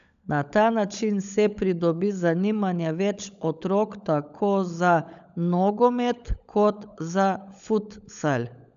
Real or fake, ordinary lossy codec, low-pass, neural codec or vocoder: fake; none; 7.2 kHz; codec, 16 kHz, 16 kbps, FunCodec, trained on LibriTTS, 50 frames a second